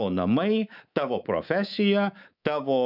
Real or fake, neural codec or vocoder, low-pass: fake; codec, 24 kHz, 3.1 kbps, DualCodec; 5.4 kHz